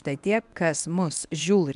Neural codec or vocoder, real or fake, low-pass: codec, 24 kHz, 0.9 kbps, WavTokenizer, medium speech release version 1; fake; 10.8 kHz